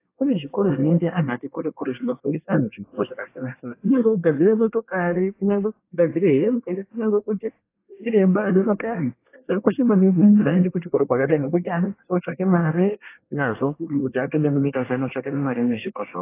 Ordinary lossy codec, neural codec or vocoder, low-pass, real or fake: AAC, 24 kbps; codec, 24 kHz, 1 kbps, SNAC; 3.6 kHz; fake